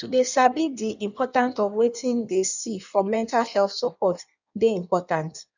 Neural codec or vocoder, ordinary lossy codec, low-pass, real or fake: codec, 16 kHz in and 24 kHz out, 1.1 kbps, FireRedTTS-2 codec; none; 7.2 kHz; fake